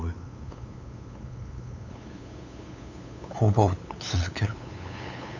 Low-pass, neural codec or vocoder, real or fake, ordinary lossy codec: 7.2 kHz; codec, 16 kHz, 8 kbps, FunCodec, trained on LibriTTS, 25 frames a second; fake; none